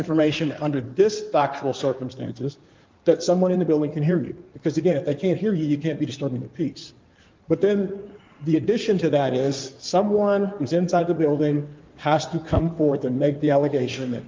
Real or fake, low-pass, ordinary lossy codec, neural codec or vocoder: fake; 7.2 kHz; Opus, 24 kbps; codec, 16 kHz in and 24 kHz out, 2.2 kbps, FireRedTTS-2 codec